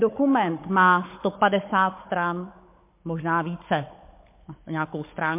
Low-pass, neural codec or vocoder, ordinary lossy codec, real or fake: 3.6 kHz; codec, 16 kHz, 16 kbps, FunCodec, trained on Chinese and English, 50 frames a second; MP3, 24 kbps; fake